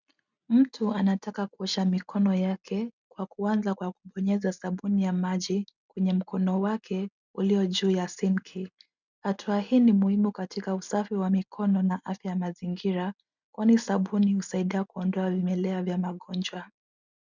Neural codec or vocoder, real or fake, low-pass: none; real; 7.2 kHz